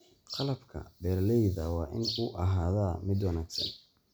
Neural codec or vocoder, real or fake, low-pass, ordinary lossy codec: none; real; none; none